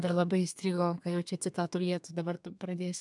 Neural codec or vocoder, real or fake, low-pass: codec, 32 kHz, 1.9 kbps, SNAC; fake; 10.8 kHz